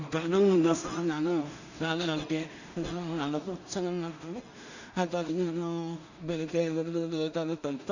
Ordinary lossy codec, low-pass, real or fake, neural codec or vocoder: none; 7.2 kHz; fake; codec, 16 kHz in and 24 kHz out, 0.4 kbps, LongCat-Audio-Codec, two codebook decoder